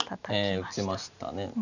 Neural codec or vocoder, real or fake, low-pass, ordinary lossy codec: none; real; 7.2 kHz; none